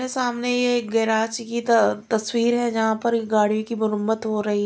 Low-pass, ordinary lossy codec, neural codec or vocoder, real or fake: none; none; none; real